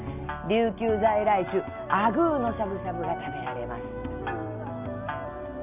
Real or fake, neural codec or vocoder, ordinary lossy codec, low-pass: real; none; none; 3.6 kHz